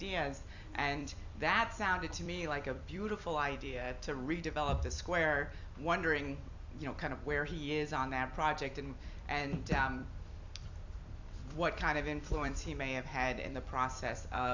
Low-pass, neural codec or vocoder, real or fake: 7.2 kHz; vocoder, 44.1 kHz, 128 mel bands every 256 samples, BigVGAN v2; fake